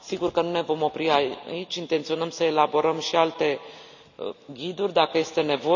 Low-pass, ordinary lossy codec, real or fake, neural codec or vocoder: 7.2 kHz; none; real; none